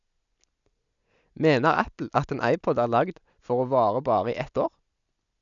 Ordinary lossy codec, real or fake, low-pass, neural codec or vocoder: none; real; 7.2 kHz; none